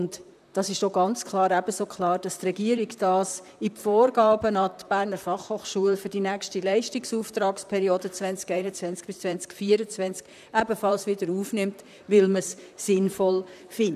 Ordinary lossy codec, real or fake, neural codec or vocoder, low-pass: none; fake; vocoder, 44.1 kHz, 128 mel bands, Pupu-Vocoder; 14.4 kHz